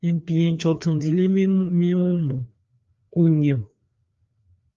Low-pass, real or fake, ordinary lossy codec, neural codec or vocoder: 7.2 kHz; fake; Opus, 32 kbps; codec, 16 kHz, 2 kbps, FreqCodec, larger model